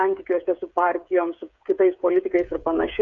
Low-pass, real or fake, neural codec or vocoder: 7.2 kHz; fake; codec, 16 kHz, 8 kbps, FreqCodec, smaller model